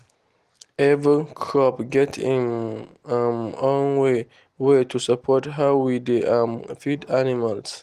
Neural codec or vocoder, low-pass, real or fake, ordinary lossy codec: none; 14.4 kHz; real; Opus, 24 kbps